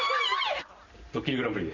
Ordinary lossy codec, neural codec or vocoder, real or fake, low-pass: none; none; real; 7.2 kHz